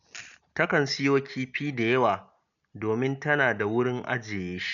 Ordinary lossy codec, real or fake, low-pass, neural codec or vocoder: none; real; 7.2 kHz; none